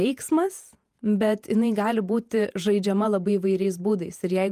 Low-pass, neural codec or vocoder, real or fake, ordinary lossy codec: 14.4 kHz; vocoder, 48 kHz, 128 mel bands, Vocos; fake; Opus, 32 kbps